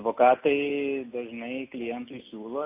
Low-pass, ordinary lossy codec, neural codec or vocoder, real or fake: 3.6 kHz; AAC, 24 kbps; none; real